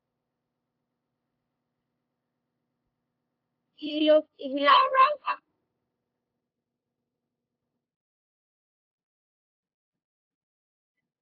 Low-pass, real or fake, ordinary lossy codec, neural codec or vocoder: 5.4 kHz; fake; AAC, 48 kbps; codec, 16 kHz, 2 kbps, FunCodec, trained on LibriTTS, 25 frames a second